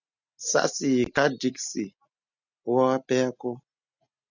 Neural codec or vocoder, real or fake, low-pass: none; real; 7.2 kHz